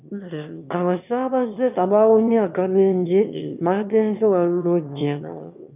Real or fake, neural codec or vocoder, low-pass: fake; autoencoder, 22.05 kHz, a latent of 192 numbers a frame, VITS, trained on one speaker; 3.6 kHz